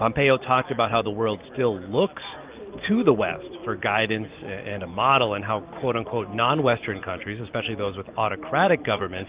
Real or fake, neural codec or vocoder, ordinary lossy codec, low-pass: real; none; Opus, 24 kbps; 3.6 kHz